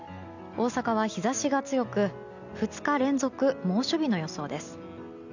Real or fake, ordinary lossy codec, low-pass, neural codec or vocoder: real; none; 7.2 kHz; none